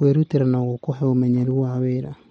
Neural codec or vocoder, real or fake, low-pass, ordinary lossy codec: none; real; 19.8 kHz; MP3, 48 kbps